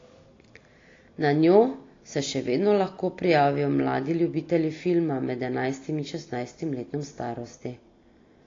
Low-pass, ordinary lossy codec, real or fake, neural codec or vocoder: 7.2 kHz; AAC, 32 kbps; real; none